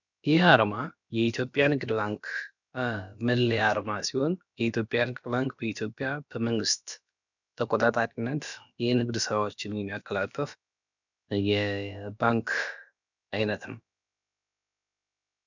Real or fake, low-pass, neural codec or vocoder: fake; 7.2 kHz; codec, 16 kHz, about 1 kbps, DyCAST, with the encoder's durations